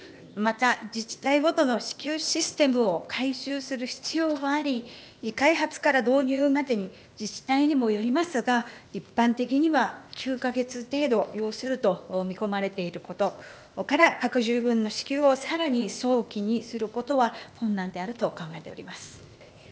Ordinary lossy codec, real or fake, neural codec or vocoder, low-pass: none; fake; codec, 16 kHz, 0.8 kbps, ZipCodec; none